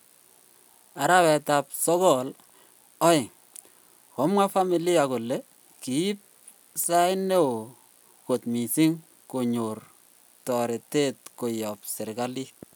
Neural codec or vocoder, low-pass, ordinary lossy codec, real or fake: none; none; none; real